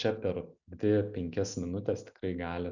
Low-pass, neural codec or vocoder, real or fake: 7.2 kHz; none; real